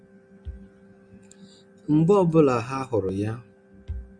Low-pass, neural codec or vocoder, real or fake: 9.9 kHz; none; real